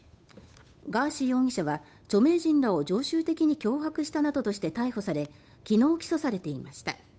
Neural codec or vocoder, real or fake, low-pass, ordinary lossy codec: codec, 16 kHz, 8 kbps, FunCodec, trained on Chinese and English, 25 frames a second; fake; none; none